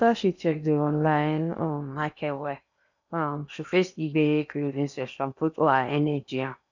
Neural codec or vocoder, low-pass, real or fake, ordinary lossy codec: codec, 16 kHz in and 24 kHz out, 0.8 kbps, FocalCodec, streaming, 65536 codes; 7.2 kHz; fake; none